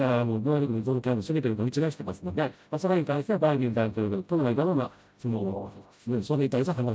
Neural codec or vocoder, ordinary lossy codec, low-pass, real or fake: codec, 16 kHz, 0.5 kbps, FreqCodec, smaller model; none; none; fake